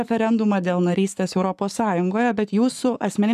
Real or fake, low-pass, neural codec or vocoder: fake; 14.4 kHz; codec, 44.1 kHz, 7.8 kbps, Pupu-Codec